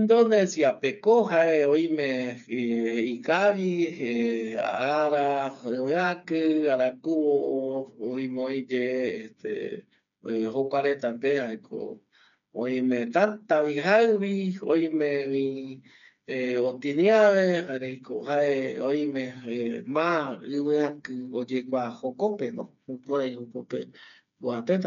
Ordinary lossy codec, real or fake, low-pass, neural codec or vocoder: none; fake; 7.2 kHz; codec, 16 kHz, 4 kbps, FreqCodec, smaller model